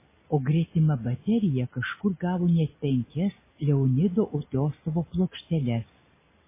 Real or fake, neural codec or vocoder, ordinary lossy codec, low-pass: real; none; MP3, 16 kbps; 3.6 kHz